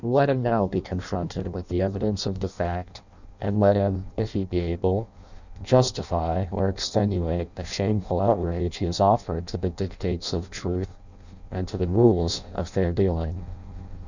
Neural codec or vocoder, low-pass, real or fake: codec, 16 kHz in and 24 kHz out, 0.6 kbps, FireRedTTS-2 codec; 7.2 kHz; fake